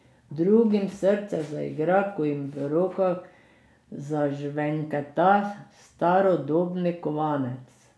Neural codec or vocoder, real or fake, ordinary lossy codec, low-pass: none; real; none; none